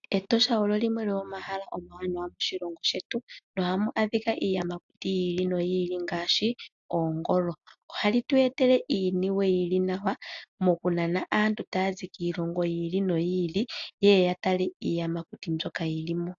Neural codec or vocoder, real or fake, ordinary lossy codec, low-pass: none; real; AAC, 64 kbps; 7.2 kHz